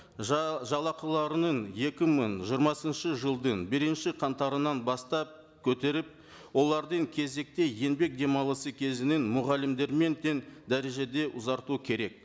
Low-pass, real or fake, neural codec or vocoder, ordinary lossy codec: none; real; none; none